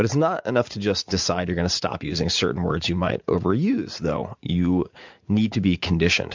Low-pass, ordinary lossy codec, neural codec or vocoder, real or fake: 7.2 kHz; MP3, 64 kbps; vocoder, 44.1 kHz, 80 mel bands, Vocos; fake